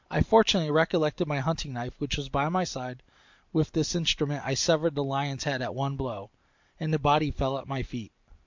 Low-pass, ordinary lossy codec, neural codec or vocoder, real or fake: 7.2 kHz; MP3, 64 kbps; vocoder, 44.1 kHz, 128 mel bands every 512 samples, BigVGAN v2; fake